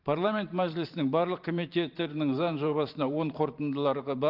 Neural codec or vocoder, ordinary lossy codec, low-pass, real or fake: vocoder, 44.1 kHz, 128 mel bands every 512 samples, BigVGAN v2; Opus, 32 kbps; 5.4 kHz; fake